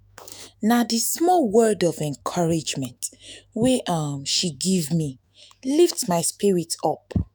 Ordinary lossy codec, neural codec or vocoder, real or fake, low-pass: none; autoencoder, 48 kHz, 128 numbers a frame, DAC-VAE, trained on Japanese speech; fake; none